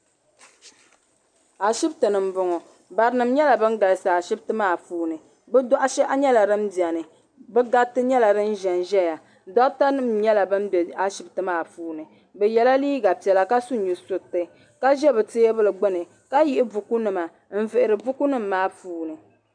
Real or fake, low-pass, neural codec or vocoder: real; 9.9 kHz; none